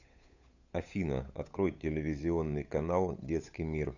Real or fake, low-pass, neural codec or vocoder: fake; 7.2 kHz; codec, 16 kHz, 8 kbps, FunCodec, trained on Chinese and English, 25 frames a second